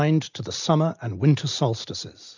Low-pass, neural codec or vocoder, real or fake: 7.2 kHz; none; real